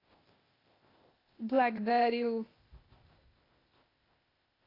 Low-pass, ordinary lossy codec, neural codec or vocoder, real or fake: 5.4 kHz; AAC, 24 kbps; codec, 16 kHz, 0.8 kbps, ZipCodec; fake